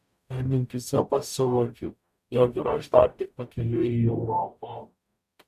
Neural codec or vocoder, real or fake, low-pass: codec, 44.1 kHz, 0.9 kbps, DAC; fake; 14.4 kHz